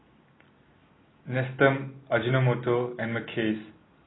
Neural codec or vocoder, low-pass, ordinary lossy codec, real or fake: none; 7.2 kHz; AAC, 16 kbps; real